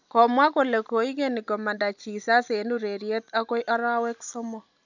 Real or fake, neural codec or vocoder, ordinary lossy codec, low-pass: real; none; none; 7.2 kHz